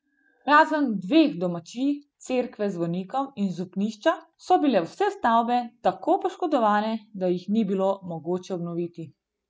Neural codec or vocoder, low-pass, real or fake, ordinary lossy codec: none; none; real; none